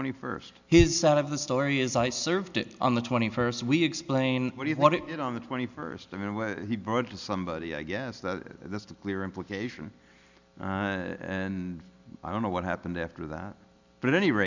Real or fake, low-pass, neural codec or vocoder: real; 7.2 kHz; none